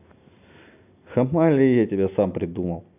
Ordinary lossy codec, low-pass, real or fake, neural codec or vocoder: none; 3.6 kHz; real; none